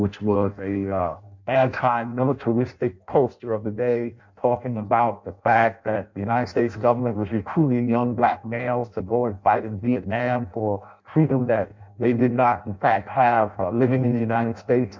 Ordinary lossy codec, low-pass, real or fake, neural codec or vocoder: MP3, 64 kbps; 7.2 kHz; fake; codec, 16 kHz in and 24 kHz out, 0.6 kbps, FireRedTTS-2 codec